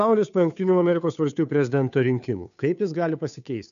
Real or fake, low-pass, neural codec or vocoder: fake; 7.2 kHz; codec, 16 kHz, 2 kbps, FunCodec, trained on Chinese and English, 25 frames a second